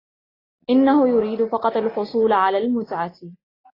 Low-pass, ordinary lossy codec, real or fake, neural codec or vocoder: 5.4 kHz; AAC, 24 kbps; real; none